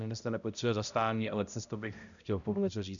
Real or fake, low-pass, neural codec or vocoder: fake; 7.2 kHz; codec, 16 kHz, 0.5 kbps, X-Codec, HuBERT features, trained on balanced general audio